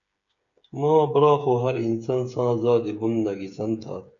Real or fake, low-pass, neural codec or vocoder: fake; 7.2 kHz; codec, 16 kHz, 8 kbps, FreqCodec, smaller model